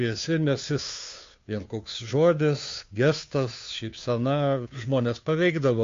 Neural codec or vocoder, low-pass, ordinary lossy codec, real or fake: codec, 16 kHz, 2 kbps, FunCodec, trained on Chinese and English, 25 frames a second; 7.2 kHz; MP3, 48 kbps; fake